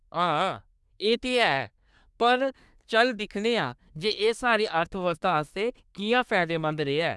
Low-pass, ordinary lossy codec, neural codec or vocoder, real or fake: none; none; codec, 24 kHz, 1 kbps, SNAC; fake